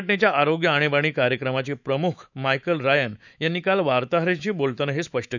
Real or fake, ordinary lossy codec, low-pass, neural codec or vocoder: fake; none; 7.2 kHz; autoencoder, 48 kHz, 128 numbers a frame, DAC-VAE, trained on Japanese speech